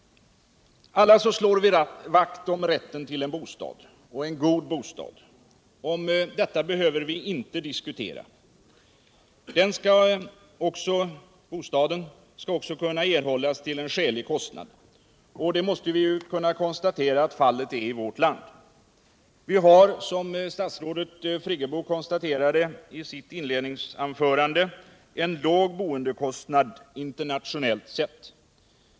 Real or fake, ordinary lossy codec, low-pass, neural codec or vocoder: real; none; none; none